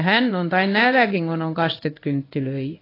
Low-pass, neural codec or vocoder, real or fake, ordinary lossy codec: 5.4 kHz; codec, 16 kHz in and 24 kHz out, 1 kbps, XY-Tokenizer; fake; AAC, 24 kbps